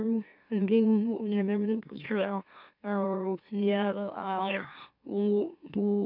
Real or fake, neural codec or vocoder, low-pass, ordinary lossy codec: fake; autoencoder, 44.1 kHz, a latent of 192 numbers a frame, MeloTTS; 5.4 kHz; none